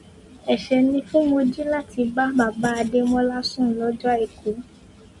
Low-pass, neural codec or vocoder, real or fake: 10.8 kHz; none; real